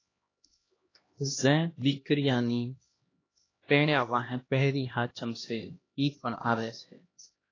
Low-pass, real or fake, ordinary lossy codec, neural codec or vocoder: 7.2 kHz; fake; AAC, 32 kbps; codec, 16 kHz, 1 kbps, X-Codec, HuBERT features, trained on LibriSpeech